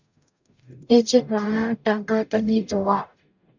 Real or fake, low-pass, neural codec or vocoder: fake; 7.2 kHz; codec, 44.1 kHz, 0.9 kbps, DAC